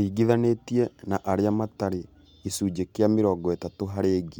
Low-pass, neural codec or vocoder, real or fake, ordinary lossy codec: 19.8 kHz; none; real; MP3, 96 kbps